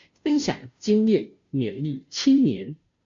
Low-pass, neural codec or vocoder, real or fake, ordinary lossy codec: 7.2 kHz; codec, 16 kHz, 0.5 kbps, FunCodec, trained on Chinese and English, 25 frames a second; fake; MP3, 48 kbps